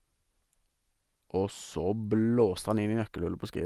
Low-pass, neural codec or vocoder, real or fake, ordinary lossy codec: 14.4 kHz; none; real; Opus, 24 kbps